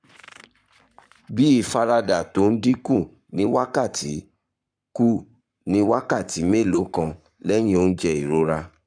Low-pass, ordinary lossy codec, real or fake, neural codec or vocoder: 9.9 kHz; none; fake; codec, 44.1 kHz, 7.8 kbps, Pupu-Codec